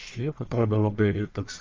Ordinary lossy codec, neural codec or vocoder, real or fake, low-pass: Opus, 32 kbps; codec, 44.1 kHz, 1.7 kbps, Pupu-Codec; fake; 7.2 kHz